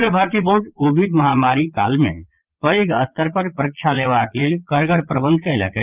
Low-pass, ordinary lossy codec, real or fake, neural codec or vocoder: 3.6 kHz; Opus, 32 kbps; fake; vocoder, 22.05 kHz, 80 mel bands, Vocos